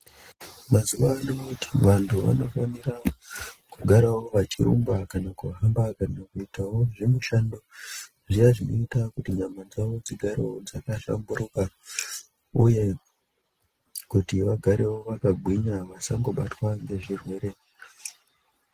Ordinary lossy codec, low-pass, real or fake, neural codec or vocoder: Opus, 16 kbps; 14.4 kHz; real; none